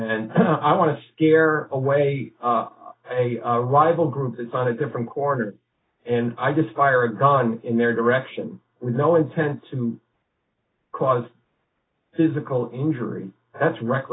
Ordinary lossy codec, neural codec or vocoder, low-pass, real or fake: AAC, 16 kbps; none; 7.2 kHz; real